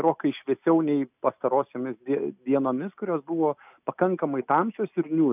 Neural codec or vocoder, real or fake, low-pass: none; real; 3.6 kHz